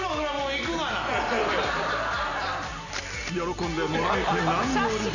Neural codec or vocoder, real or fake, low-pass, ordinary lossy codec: none; real; 7.2 kHz; none